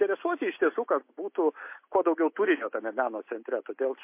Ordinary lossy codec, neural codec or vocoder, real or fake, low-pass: MP3, 24 kbps; none; real; 3.6 kHz